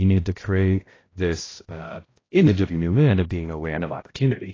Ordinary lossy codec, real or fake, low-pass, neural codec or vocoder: AAC, 32 kbps; fake; 7.2 kHz; codec, 16 kHz, 0.5 kbps, X-Codec, HuBERT features, trained on balanced general audio